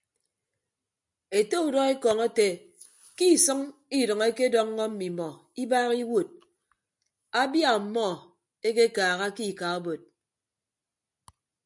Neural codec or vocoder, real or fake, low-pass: none; real; 10.8 kHz